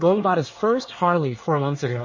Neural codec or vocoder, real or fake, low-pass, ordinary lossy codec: codec, 24 kHz, 1 kbps, SNAC; fake; 7.2 kHz; MP3, 32 kbps